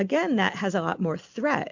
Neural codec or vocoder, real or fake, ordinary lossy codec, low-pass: none; real; MP3, 64 kbps; 7.2 kHz